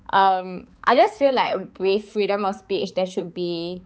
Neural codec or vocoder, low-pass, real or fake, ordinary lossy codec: codec, 16 kHz, 4 kbps, X-Codec, HuBERT features, trained on balanced general audio; none; fake; none